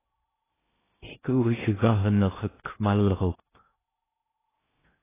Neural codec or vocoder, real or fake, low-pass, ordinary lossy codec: codec, 16 kHz in and 24 kHz out, 0.6 kbps, FocalCodec, streaming, 2048 codes; fake; 3.6 kHz; AAC, 16 kbps